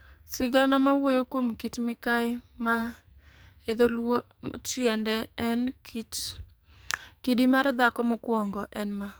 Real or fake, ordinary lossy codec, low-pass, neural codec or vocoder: fake; none; none; codec, 44.1 kHz, 2.6 kbps, SNAC